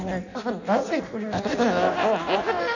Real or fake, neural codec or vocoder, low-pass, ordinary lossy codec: fake; codec, 16 kHz in and 24 kHz out, 0.6 kbps, FireRedTTS-2 codec; 7.2 kHz; none